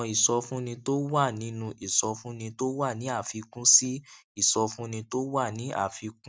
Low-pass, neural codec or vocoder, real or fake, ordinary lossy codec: none; none; real; none